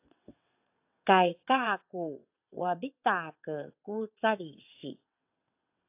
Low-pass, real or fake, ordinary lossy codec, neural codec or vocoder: 3.6 kHz; fake; AAC, 32 kbps; vocoder, 22.05 kHz, 80 mel bands, WaveNeXt